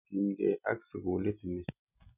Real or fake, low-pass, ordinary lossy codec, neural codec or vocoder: real; 3.6 kHz; none; none